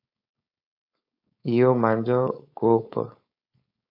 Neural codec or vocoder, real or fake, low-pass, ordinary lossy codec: codec, 16 kHz, 4.8 kbps, FACodec; fake; 5.4 kHz; AAC, 32 kbps